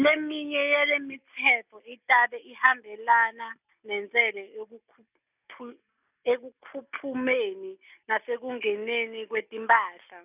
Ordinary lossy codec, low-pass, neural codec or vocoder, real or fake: none; 3.6 kHz; none; real